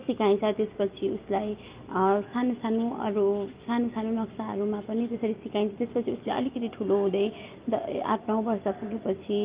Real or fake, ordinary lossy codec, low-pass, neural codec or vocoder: real; Opus, 32 kbps; 3.6 kHz; none